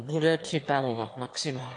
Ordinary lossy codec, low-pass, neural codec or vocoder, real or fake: AAC, 48 kbps; 9.9 kHz; autoencoder, 22.05 kHz, a latent of 192 numbers a frame, VITS, trained on one speaker; fake